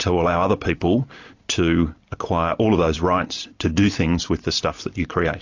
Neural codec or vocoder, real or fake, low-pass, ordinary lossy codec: none; real; 7.2 kHz; AAC, 48 kbps